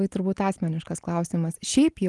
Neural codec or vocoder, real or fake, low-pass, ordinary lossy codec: none; real; 10.8 kHz; Opus, 24 kbps